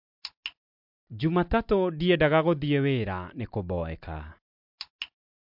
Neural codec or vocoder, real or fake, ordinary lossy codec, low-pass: none; real; MP3, 48 kbps; 5.4 kHz